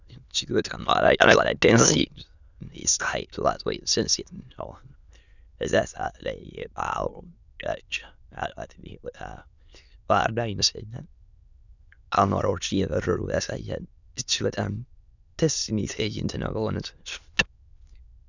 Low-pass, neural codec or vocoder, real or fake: 7.2 kHz; autoencoder, 22.05 kHz, a latent of 192 numbers a frame, VITS, trained on many speakers; fake